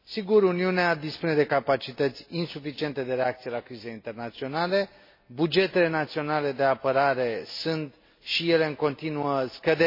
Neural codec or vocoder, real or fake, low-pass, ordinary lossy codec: none; real; 5.4 kHz; MP3, 24 kbps